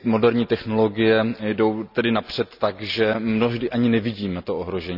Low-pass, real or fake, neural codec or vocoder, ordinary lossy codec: 5.4 kHz; real; none; none